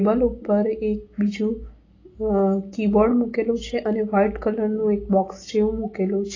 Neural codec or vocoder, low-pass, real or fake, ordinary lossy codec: none; 7.2 kHz; real; AAC, 32 kbps